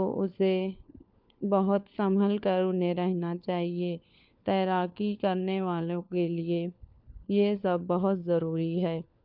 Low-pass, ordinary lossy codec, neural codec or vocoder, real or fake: 5.4 kHz; none; codec, 16 kHz, 4 kbps, FunCodec, trained on LibriTTS, 50 frames a second; fake